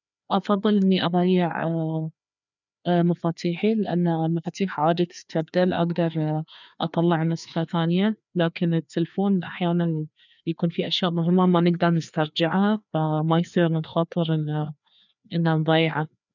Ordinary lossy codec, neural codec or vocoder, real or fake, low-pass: none; codec, 16 kHz, 2 kbps, FreqCodec, larger model; fake; 7.2 kHz